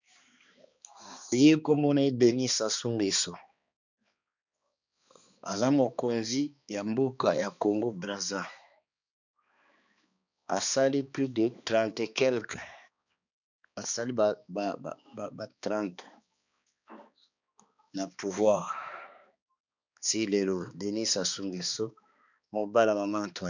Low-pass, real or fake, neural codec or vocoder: 7.2 kHz; fake; codec, 16 kHz, 2 kbps, X-Codec, HuBERT features, trained on balanced general audio